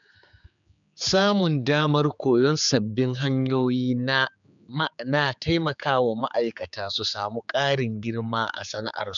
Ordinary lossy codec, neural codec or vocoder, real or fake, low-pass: MP3, 96 kbps; codec, 16 kHz, 4 kbps, X-Codec, HuBERT features, trained on general audio; fake; 7.2 kHz